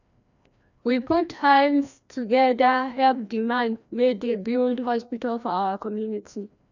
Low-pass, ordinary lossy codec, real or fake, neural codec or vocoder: 7.2 kHz; none; fake; codec, 16 kHz, 1 kbps, FreqCodec, larger model